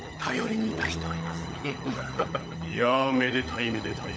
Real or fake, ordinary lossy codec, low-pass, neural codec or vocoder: fake; none; none; codec, 16 kHz, 16 kbps, FunCodec, trained on LibriTTS, 50 frames a second